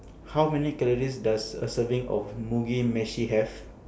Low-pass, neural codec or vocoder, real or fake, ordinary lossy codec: none; none; real; none